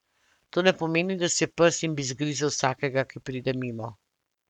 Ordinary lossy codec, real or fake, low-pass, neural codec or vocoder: none; fake; 19.8 kHz; codec, 44.1 kHz, 7.8 kbps, Pupu-Codec